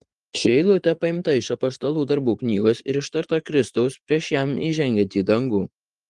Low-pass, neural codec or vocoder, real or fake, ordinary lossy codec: 10.8 kHz; vocoder, 24 kHz, 100 mel bands, Vocos; fake; Opus, 24 kbps